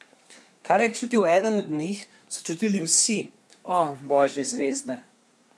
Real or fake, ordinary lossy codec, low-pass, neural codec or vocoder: fake; none; none; codec, 24 kHz, 1 kbps, SNAC